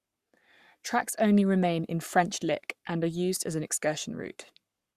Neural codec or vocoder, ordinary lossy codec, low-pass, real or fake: codec, 44.1 kHz, 7.8 kbps, Pupu-Codec; Opus, 64 kbps; 14.4 kHz; fake